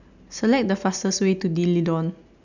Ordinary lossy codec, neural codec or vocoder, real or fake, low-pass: none; none; real; 7.2 kHz